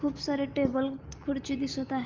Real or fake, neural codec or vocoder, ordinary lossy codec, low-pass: real; none; Opus, 32 kbps; 7.2 kHz